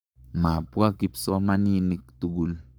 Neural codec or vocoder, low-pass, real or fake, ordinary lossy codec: codec, 44.1 kHz, 7.8 kbps, Pupu-Codec; none; fake; none